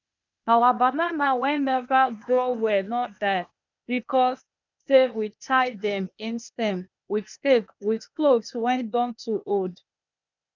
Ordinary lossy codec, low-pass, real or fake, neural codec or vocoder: none; 7.2 kHz; fake; codec, 16 kHz, 0.8 kbps, ZipCodec